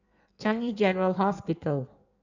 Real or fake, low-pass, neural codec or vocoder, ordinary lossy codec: fake; 7.2 kHz; codec, 16 kHz in and 24 kHz out, 1.1 kbps, FireRedTTS-2 codec; none